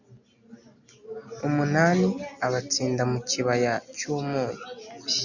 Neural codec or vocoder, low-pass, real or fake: none; 7.2 kHz; real